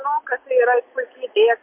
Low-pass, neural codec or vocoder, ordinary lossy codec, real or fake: 3.6 kHz; none; MP3, 32 kbps; real